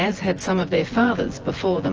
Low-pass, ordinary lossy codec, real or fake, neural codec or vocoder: 7.2 kHz; Opus, 16 kbps; fake; vocoder, 24 kHz, 100 mel bands, Vocos